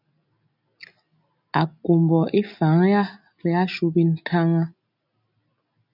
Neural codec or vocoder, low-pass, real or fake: none; 5.4 kHz; real